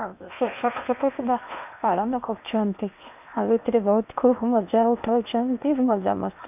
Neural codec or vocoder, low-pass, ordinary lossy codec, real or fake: codec, 16 kHz in and 24 kHz out, 0.8 kbps, FocalCodec, streaming, 65536 codes; 3.6 kHz; none; fake